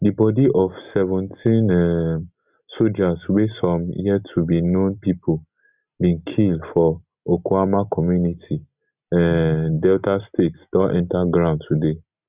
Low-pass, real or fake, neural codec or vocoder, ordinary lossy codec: 3.6 kHz; real; none; Opus, 64 kbps